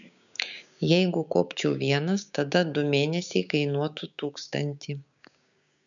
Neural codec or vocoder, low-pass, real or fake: codec, 16 kHz, 6 kbps, DAC; 7.2 kHz; fake